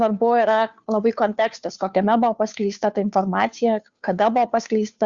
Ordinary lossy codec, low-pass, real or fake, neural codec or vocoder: Opus, 64 kbps; 7.2 kHz; fake; codec, 16 kHz, 2 kbps, FunCodec, trained on Chinese and English, 25 frames a second